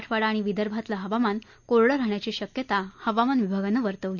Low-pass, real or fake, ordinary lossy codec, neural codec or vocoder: 7.2 kHz; real; none; none